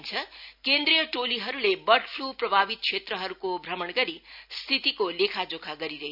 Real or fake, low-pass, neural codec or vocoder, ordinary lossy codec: real; 5.4 kHz; none; none